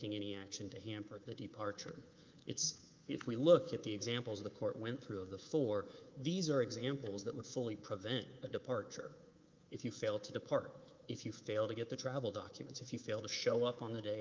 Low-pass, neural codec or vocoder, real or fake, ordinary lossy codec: 7.2 kHz; codec, 24 kHz, 3.1 kbps, DualCodec; fake; Opus, 24 kbps